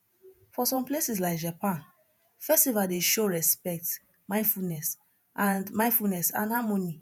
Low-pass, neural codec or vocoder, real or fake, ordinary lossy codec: none; vocoder, 48 kHz, 128 mel bands, Vocos; fake; none